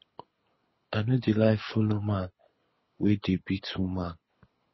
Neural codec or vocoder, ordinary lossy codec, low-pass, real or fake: codec, 24 kHz, 6 kbps, HILCodec; MP3, 24 kbps; 7.2 kHz; fake